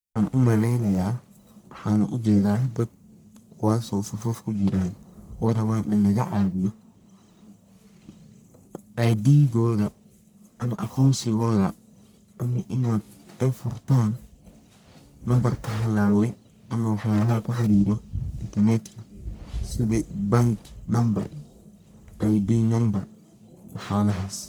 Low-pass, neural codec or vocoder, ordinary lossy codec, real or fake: none; codec, 44.1 kHz, 1.7 kbps, Pupu-Codec; none; fake